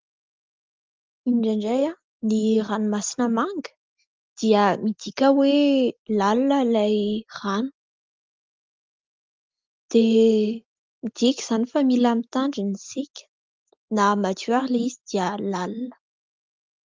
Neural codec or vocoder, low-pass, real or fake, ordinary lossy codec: none; 7.2 kHz; real; Opus, 32 kbps